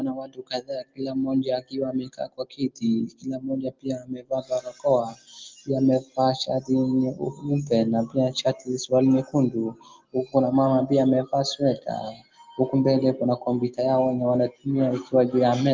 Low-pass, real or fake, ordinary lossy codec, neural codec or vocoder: 7.2 kHz; real; Opus, 32 kbps; none